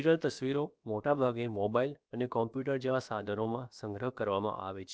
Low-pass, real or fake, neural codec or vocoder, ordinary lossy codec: none; fake; codec, 16 kHz, about 1 kbps, DyCAST, with the encoder's durations; none